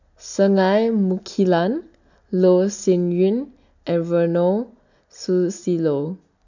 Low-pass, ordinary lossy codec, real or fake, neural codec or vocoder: 7.2 kHz; none; real; none